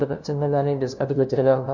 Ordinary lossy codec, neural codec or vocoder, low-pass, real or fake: none; codec, 16 kHz, 0.5 kbps, FunCodec, trained on LibriTTS, 25 frames a second; 7.2 kHz; fake